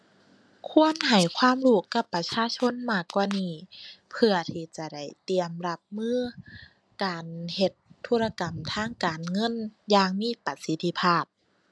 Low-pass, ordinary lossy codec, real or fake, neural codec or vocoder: none; none; real; none